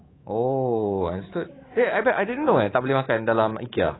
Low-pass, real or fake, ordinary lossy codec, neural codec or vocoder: 7.2 kHz; fake; AAC, 16 kbps; codec, 24 kHz, 3.1 kbps, DualCodec